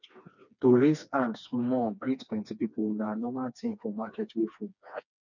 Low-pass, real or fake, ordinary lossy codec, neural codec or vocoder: 7.2 kHz; fake; none; codec, 16 kHz, 2 kbps, FreqCodec, smaller model